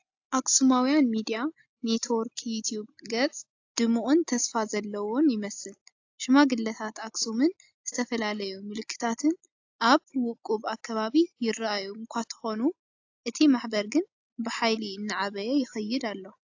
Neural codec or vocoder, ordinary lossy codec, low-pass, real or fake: none; AAC, 48 kbps; 7.2 kHz; real